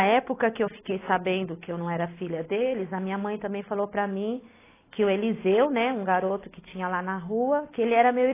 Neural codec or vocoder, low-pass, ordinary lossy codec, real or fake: none; 3.6 kHz; AAC, 16 kbps; real